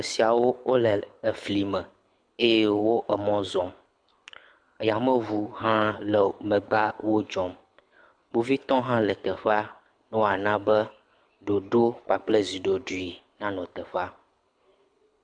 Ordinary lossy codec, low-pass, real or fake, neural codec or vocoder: AAC, 64 kbps; 9.9 kHz; fake; codec, 24 kHz, 6 kbps, HILCodec